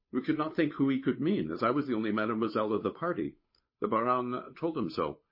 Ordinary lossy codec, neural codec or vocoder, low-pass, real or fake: MP3, 24 kbps; codec, 16 kHz, 8 kbps, FunCodec, trained on Chinese and English, 25 frames a second; 5.4 kHz; fake